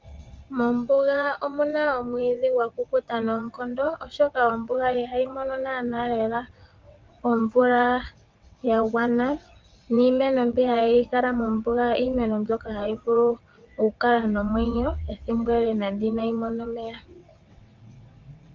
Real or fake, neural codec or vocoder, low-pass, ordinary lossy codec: fake; vocoder, 22.05 kHz, 80 mel bands, WaveNeXt; 7.2 kHz; Opus, 32 kbps